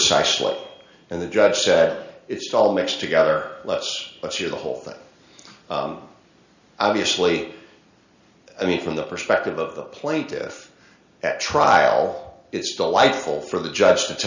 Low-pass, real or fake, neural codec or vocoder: 7.2 kHz; real; none